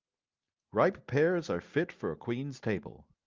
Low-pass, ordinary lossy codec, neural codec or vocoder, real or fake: 7.2 kHz; Opus, 24 kbps; none; real